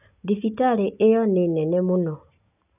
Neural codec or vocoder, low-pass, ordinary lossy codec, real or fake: none; 3.6 kHz; none; real